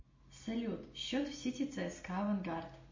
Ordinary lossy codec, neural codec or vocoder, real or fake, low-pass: MP3, 32 kbps; none; real; 7.2 kHz